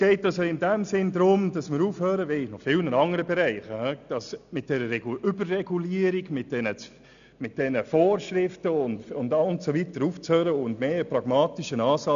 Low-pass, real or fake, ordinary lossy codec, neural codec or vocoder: 7.2 kHz; real; none; none